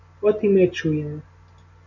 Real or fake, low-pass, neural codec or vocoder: real; 7.2 kHz; none